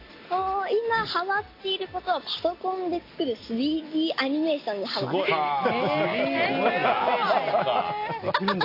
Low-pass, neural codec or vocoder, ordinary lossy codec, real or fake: 5.4 kHz; none; AAC, 32 kbps; real